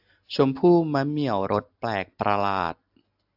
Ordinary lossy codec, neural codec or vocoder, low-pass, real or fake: AAC, 48 kbps; none; 5.4 kHz; real